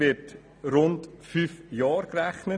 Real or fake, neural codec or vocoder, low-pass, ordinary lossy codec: real; none; 9.9 kHz; none